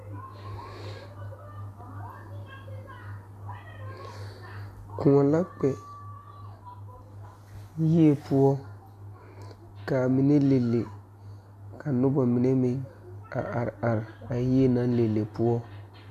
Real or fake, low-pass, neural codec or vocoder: real; 14.4 kHz; none